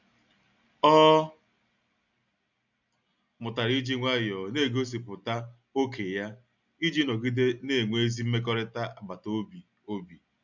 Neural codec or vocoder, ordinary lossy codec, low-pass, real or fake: none; none; 7.2 kHz; real